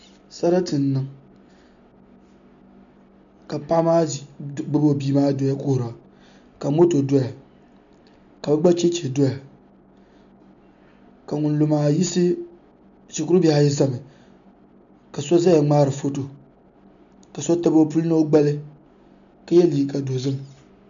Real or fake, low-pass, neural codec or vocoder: real; 7.2 kHz; none